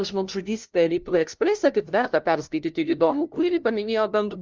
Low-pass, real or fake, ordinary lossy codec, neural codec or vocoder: 7.2 kHz; fake; Opus, 32 kbps; codec, 16 kHz, 0.5 kbps, FunCodec, trained on LibriTTS, 25 frames a second